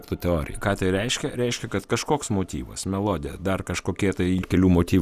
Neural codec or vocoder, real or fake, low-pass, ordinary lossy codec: none; real; 14.4 kHz; Opus, 64 kbps